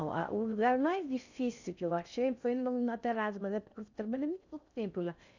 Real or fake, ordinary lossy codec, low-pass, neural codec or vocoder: fake; none; 7.2 kHz; codec, 16 kHz in and 24 kHz out, 0.6 kbps, FocalCodec, streaming, 4096 codes